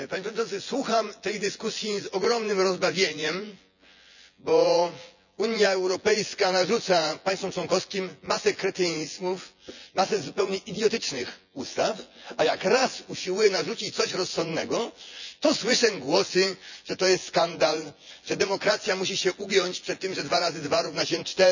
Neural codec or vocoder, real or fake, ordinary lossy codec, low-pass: vocoder, 24 kHz, 100 mel bands, Vocos; fake; none; 7.2 kHz